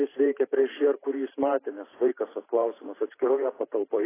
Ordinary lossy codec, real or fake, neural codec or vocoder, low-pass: AAC, 16 kbps; fake; vocoder, 44.1 kHz, 128 mel bands every 512 samples, BigVGAN v2; 3.6 kHz